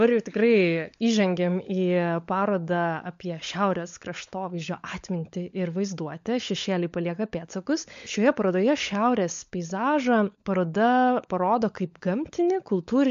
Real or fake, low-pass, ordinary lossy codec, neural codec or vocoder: fake; 7.2 kHz; MP3, 48 kbps; codec, 16 kHz, 16 kbps, FunCodec, trained on LibriTTS, 50 frames a second